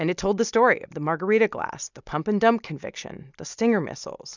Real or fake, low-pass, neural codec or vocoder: real; 7.2 kHz; none